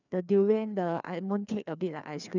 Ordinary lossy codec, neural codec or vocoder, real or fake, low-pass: none; codec, 16 kHz, 2 kbps, FreqCodec, larger model; fake; 7.2 kHz